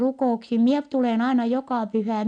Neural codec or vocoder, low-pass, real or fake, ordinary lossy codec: none; 9.9 kHz; real; none